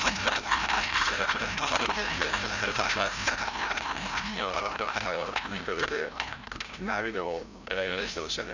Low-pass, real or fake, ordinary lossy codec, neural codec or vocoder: 7.2 kHz; fake; none; codec, 16 kHz, 0.5 kbps, FreqCodec, larger model